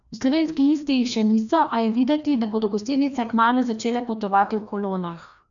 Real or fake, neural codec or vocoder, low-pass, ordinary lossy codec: fake; codec, 16 kHz, 1 kbps, FreqCodec, larger model; 7.2 kHz; none